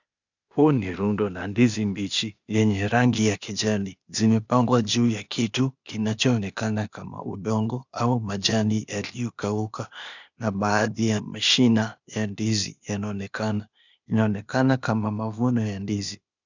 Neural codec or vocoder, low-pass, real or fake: codec, 16 kHz, 0.8 kbps, ZipCodec; 7.2 kHz; fake